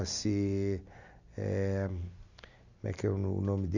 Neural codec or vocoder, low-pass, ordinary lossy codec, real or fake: none; 7.2 kHz; none; real